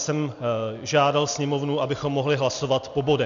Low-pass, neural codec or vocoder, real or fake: 7.2 kHz; none; real